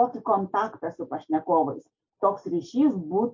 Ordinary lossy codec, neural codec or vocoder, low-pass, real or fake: MP3, 48 kbps; none; 7.2 kHz; real